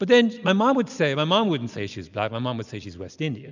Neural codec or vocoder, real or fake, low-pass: none; real; 7.2 kHz